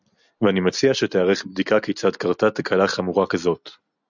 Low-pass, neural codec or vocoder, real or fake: 7.2 kHz; none; real